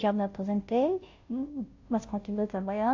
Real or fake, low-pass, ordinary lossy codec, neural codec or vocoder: fake; 7.2 kHz; AAC, 48 kbps; codec, 16 kHz, 0.5 kbps, FunCodec, trained on Chinese and English, 25 frames a second